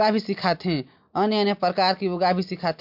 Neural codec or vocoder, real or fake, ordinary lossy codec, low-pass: none; real; MP3, 48 kbps; 5.4 kHz